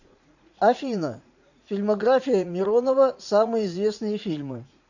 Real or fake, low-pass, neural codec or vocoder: fake; 7.2 kHz; vocoder, 44.1 kHz, 80 mel bands, Vocos